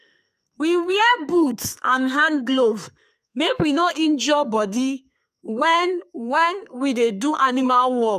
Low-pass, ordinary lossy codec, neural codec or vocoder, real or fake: 14.4 kHz; none; codec, 32 kHz, 1.9 kbps, SNAC; fake